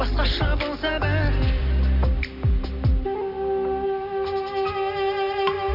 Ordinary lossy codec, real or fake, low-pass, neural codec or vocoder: none; real; 5.4 kHz; none